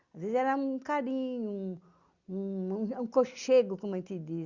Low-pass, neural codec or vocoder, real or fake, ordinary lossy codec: 7.2 kHz; none; real; Opus, 64 kbps